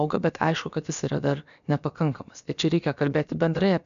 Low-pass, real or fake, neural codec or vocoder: 7.2 kHz; fake; codec, 16 kHz, about 1 kbps, DyCAST, with the encoder's durations